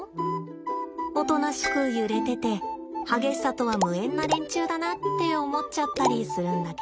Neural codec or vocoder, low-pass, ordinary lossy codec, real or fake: none; none; none; real